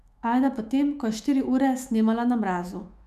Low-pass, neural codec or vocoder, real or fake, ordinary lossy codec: 14.4 kHz; autoencoder, 48 kHz, 128 numbers a frame, DAC-VAE, trained on Japanese speech; fake; MP3, 96 kbps